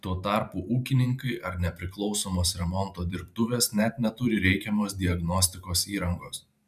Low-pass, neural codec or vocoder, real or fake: 14.4 kHz; vocoder, 44.1 kHz, 128 mel bands every 512 samples, BigVGAN v2; fake